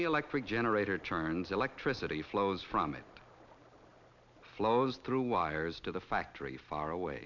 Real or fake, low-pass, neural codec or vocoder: real; 7.2 kHz; none